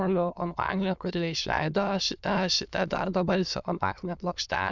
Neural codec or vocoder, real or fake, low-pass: autoencoder, 22.05 kHz, a latent of 192 numbers a frame, VITS, trained on many speakers; fake; 7.2 kHz